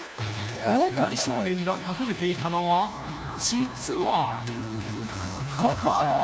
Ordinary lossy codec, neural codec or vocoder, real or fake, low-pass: none; codec, 16 kHz, 1 kbps, FunCodec, trained on LibriTTS, 50 frames a second; fake; none